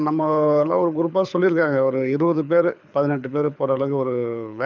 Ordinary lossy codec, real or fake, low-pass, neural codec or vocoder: none; fake; 7.2 kHz; codec, 24 kHz, 6 kbps, HILCodec